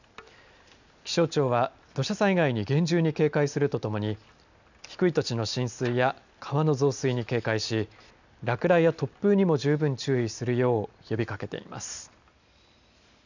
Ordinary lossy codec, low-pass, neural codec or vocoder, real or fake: none; 7.2 kHz; none; real